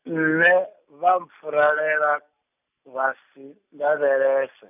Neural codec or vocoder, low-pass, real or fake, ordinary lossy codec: none; 3.6 kHz; real; none